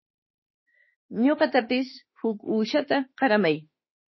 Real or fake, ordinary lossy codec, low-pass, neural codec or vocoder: fake; MP3, 24 kbps; 7.2 kHz; autoencoder, 48 kHz, 32 numbers a frame, DAC-VAE, trained on Japanese speech